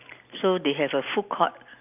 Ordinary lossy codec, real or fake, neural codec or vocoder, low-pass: none; real; none; 3.6 kHz